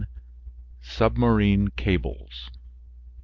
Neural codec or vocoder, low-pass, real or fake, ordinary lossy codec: none; 7.2 kHz; real; Opus, 32 kbps